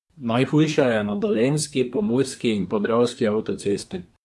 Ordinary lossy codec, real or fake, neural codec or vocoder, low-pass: none; fake; codec, 24 kHz, 1 kbps, SNAC; none